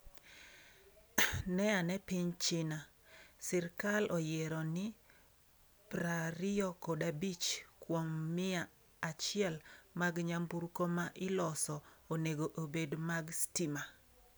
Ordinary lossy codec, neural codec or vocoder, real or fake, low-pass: none; none; real; none